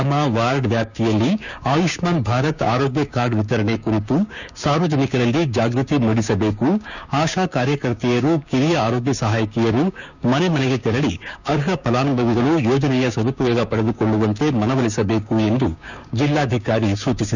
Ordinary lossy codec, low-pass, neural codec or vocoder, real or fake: none; 7.2 kHz; codec, 16 kHz, 6 kbps, DAC; fake